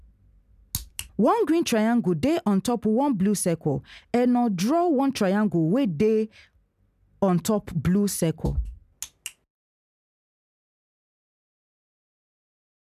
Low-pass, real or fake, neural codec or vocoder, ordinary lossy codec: 14.4 kHz; real; none; none